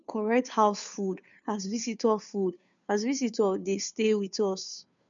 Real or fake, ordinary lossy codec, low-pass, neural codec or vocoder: fake; none; 7.2 kHz; codec, 16 kHz, 2 kbps, FunCodec, trained on Chinese and English, 25 frames a second